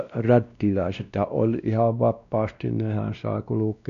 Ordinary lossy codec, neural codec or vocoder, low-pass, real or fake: none; codec, 16 kHz, 1 kbps, X-Codec, WavLM features, trained on Multilingual LibriSpeech; 7.2 kHz; fake